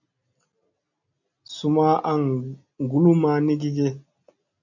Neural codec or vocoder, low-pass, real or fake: none; 7.2 kHz; real